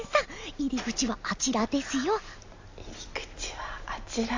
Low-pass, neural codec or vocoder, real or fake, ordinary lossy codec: 7.2 kHz; none; real; AAC, 48 kbps